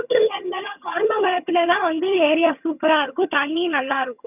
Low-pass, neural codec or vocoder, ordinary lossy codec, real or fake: 3.6 kHz; vocoder, 22.05 kHz, 80 mel bands, HiFi-GAN; none; fake